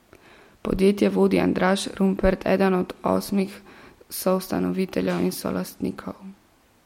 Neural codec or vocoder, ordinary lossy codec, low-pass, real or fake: none; MP3, 64 kbps; 19.8 kHz; real